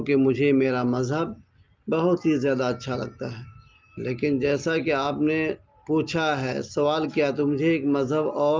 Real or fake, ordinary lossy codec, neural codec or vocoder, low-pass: real; Opus, 24 kbps; none; 7.2 kHz